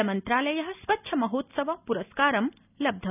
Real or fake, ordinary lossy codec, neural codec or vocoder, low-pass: real; none; none; 3.6 kHz